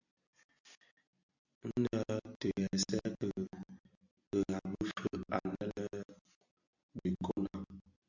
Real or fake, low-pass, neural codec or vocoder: real; 7.2 kHz; none